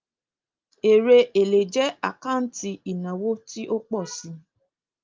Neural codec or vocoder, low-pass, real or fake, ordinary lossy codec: none; 7.2 kHz; real; Opus, 24 kbps